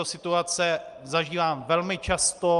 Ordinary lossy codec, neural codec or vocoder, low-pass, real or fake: Opus, 32 kbps; none; 10.8 kHz; real